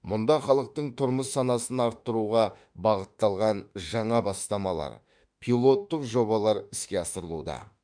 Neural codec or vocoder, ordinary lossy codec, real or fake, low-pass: autoencoder, 48 kHz, 32 numbers a frame, DAC-VAE, trained on Japanese speech; none; fake; 9.9 kHz